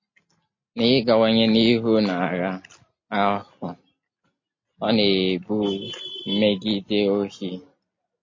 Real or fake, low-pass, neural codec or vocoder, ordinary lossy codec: real; 7.2 kHz; none; MP3, 32 kbps